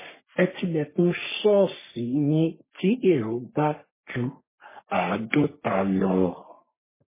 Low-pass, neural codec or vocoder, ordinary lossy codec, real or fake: 3.6 kHz; codec, 44.1 kHz, 3.4 kbps, Pupu-Codec; MP3, 16 kbps; fake